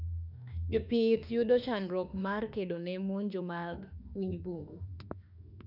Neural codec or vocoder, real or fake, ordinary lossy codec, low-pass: autoencoder, 48 kHz, 32 numbers a frame, DAC-VAE, trained on Japanese speech; fake; none; 5.4 kHz